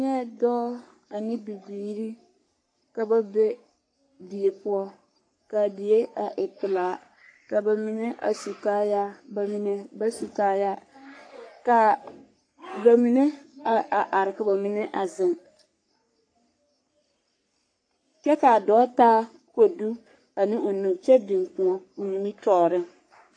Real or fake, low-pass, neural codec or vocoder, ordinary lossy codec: fake; 9.9 kHz; codec, 44.1 kHz, 3.4 kbps, Pupu-Codec; AAC, 48 kbps